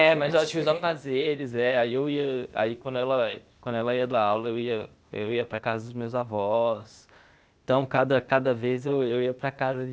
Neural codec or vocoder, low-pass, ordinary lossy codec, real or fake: codec, 16 kHz, 0.8 kbps, ZipCodec; none; none; fake